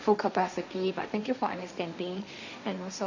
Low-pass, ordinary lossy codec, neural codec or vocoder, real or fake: 7.2 kHz; none; codec, 16 kHz, 1.1 kbps, Voila-Tokenizer; fake